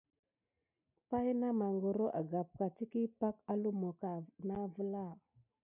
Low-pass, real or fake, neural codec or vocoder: 3.6 kHz; real; none